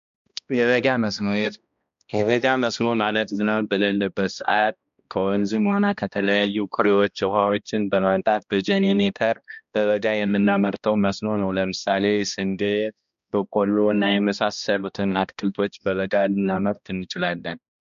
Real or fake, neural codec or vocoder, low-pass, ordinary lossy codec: fake; codec, 16 kHz, 1 kbps, X-Codec, HuBERT features, trained on balanced general audio; 7.2 kHz; MP3, 64 kbps